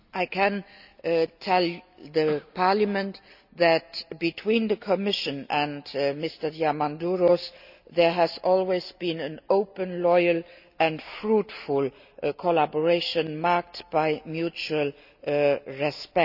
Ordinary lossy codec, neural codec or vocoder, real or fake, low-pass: none; none; real; 5.4 kHz